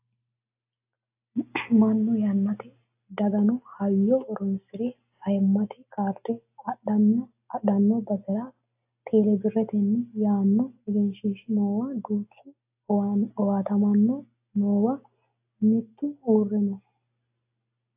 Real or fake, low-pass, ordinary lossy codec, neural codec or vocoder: real; 3.6 kHz; AAC, 32 kbps; none